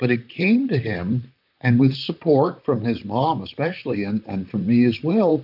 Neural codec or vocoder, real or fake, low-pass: vocoder, 44.1 kHz, 128 mel bands, Pupu-Vocoder; fake; 5.4 kHz